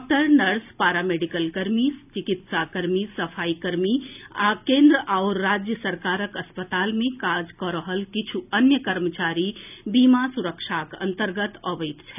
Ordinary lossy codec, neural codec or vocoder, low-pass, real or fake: none; none; 3.6 kHz; real